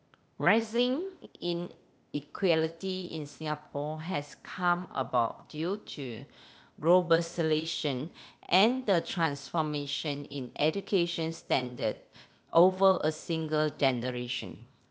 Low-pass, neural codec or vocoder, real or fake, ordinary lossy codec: none; codec, 16 kHz, 0.8 kbps, ZipCodec; fake; none